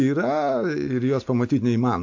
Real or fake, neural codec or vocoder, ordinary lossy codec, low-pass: real; none; AAC, 48 kbps; 7.2 kHz